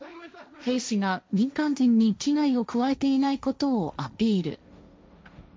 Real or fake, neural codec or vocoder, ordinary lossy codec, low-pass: fake; codec, 16 kHz, 1.1 kbps, Voila-Tokenizer; none; none